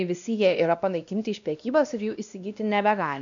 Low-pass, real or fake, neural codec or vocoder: 7.2 kHz; fake; codec, 16 kHz, 1 kbps, X-Codec, WavLM features, trained on Multilingual LibriSpeech